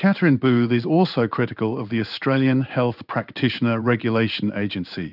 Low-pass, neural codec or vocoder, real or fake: 5.4 kHz; codec, 16 kHz in and 24 kHz out, 1 kbps, XY-Tokenizer; fake